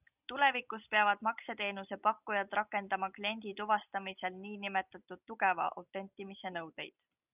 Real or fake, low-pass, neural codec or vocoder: real; 3.6 kHz; none